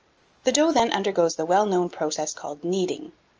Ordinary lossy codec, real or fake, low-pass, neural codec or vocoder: Opus, 24 kbps; real; 7.2 kHz; none